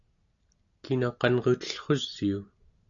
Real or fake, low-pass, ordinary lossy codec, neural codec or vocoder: real; 7.2 kHz; AAC, 64 kbps; none